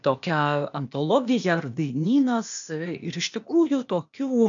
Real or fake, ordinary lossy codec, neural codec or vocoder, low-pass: fake; AAC, 64 kbps; codec, 16 kHz, 0.8 kbps, ZipCodec; 7.2 kHz